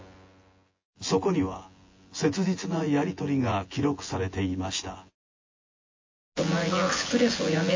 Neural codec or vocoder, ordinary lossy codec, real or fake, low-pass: vocoder, 24 kHz, 100 mel bands, Vocos; MP3, 32 kbps; fake; 7.2 kHz